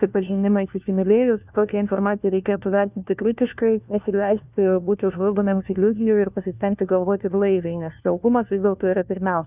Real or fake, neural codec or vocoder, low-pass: fake; codec, 16 kHz, 1 kbps, FunCodec, trained on LibriTTS, 50 frames a second; 3.6 kHz